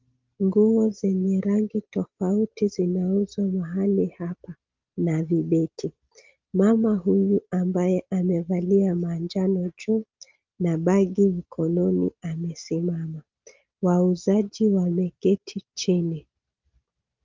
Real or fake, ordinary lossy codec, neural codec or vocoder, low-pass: real; Opus, 24 kbps; none; 7.2 kHz